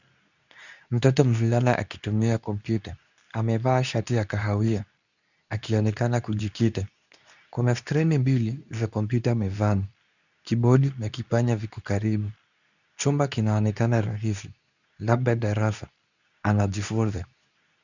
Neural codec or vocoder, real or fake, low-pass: codec, 24 kHz, 0.9 kbps, WavTokenizer, medium speech release version 2; fake; 7.2 kHz